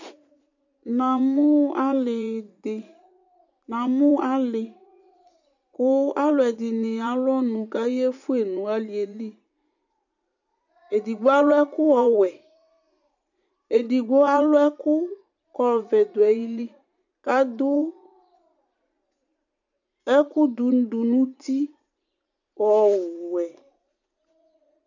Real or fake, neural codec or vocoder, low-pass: fake; vocoder, 44.1 kHz, 80 mel bands, Vocos; 7.2 kHz